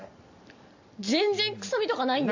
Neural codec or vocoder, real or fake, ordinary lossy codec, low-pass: none; real; AAC, 48 kbps; 7.2 kHz